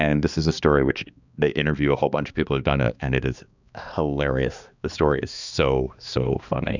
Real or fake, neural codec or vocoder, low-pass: fake; codec, 16 kHz, 2 kbps, X-Codec, HuBERT features, trained on balanced general audio; 7.2 kHz